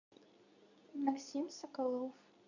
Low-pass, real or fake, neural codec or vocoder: 7.2 kHz; fake; codec, 24 kHz, 0.9 kbps, WavTokenizer, medium speech release version 2